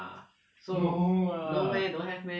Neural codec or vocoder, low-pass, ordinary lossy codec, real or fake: none; none; none; real